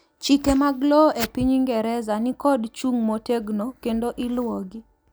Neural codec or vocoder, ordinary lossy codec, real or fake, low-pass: none; none; real; none